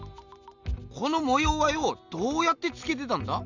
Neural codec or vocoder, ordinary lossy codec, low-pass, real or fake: none; none; 7.2 kHz; real